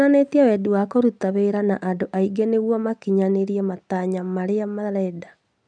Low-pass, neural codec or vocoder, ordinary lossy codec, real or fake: 9.9 kHz; none; none; real